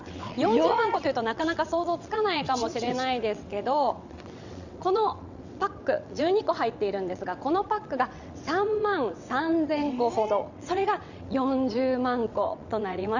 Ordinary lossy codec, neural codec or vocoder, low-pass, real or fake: none; vocoder, 22.05 kHz, 80 mel bands, WaveNeXt; 7.2 kHz; fake